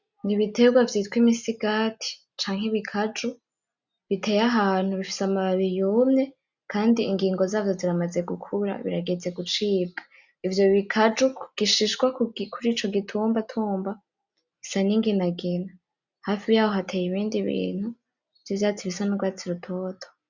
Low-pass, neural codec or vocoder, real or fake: 7.2 kHz; none; real